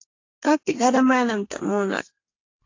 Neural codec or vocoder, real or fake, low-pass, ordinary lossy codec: codec, 32 kHz, 1.9 kbps, SNAC; fake; 7.2 kHz; AAC, 32 kbps